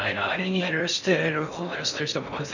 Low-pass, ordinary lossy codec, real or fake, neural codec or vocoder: 7.2 kHz; none; fake; codec, 16 kHz in and 24 kHz out, 0.6 kbps, FocalCodec, streaming, 4096 codes